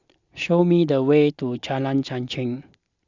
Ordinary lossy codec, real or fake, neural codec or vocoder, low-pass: Opus, 64 kbps; real; none; 7.2 kHz